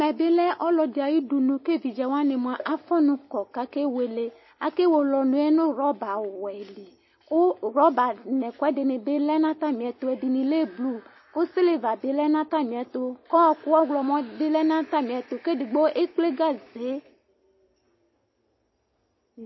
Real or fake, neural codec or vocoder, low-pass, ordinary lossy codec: real; none; 7.2 kHz; MP3, 24 kbps